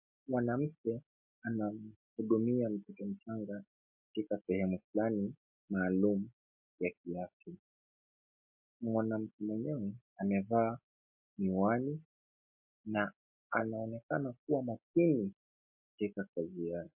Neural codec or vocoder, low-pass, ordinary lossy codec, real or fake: none; 3.6 kHz; MP3, 32 kbps; real